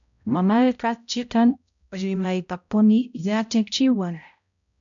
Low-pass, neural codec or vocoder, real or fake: 7.2 kHz; codec, 16 kHz, 0.5 kbps, X-Codec, HuBERT features, trained on balanced general audio; fake